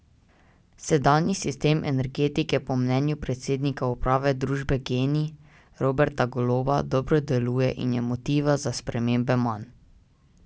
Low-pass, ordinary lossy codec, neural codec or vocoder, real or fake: none; none; none; real